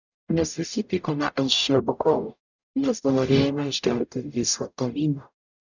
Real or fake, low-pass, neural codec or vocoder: fake; 7.2 kHz; codec, 44.1 kHz, 0.9 kbps, DAC